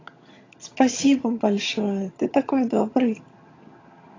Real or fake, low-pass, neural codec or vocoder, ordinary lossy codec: fake; 7.2 kHz; vocoder, 22.05 kHz, 80 mel bands, HiFi-GAN; AAC, 32 kbps